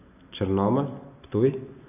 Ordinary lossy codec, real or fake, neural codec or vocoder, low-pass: none; real; none; 3.6 kHz